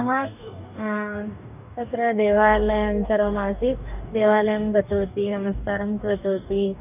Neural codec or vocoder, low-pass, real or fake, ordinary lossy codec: codec, 44.1 kHz, 2.6 kbps, DAC; 3.6 kHz; fake; none